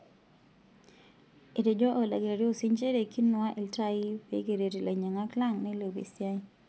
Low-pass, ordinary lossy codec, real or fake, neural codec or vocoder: none; none; real; none